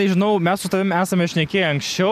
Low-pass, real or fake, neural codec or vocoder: 14.4 kHz; real; none